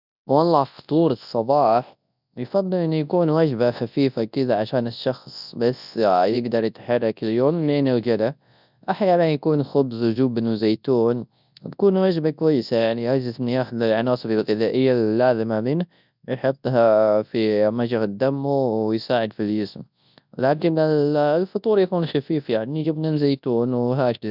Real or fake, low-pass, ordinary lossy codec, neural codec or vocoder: fake; 5.4 kHz; none; codec, 24 kHz, 0.9 kbps, WavTokenizer, large speech release